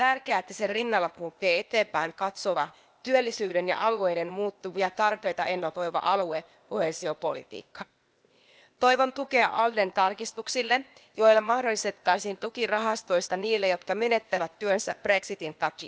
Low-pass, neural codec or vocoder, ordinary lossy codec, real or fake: none; codec, 16 kHz, 0.8 kbps, ZipCodec; none; fake